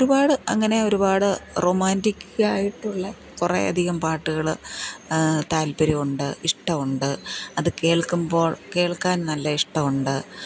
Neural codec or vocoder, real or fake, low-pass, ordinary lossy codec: none; real; none; none